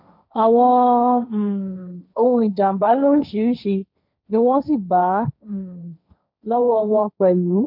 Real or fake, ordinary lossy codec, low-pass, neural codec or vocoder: fake; none; 5.4 kHz; codec, 16 kHz, 1.1 kbps, Voila-Tokenizer